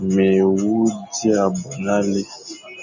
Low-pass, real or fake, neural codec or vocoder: 7.2 kHz; real; none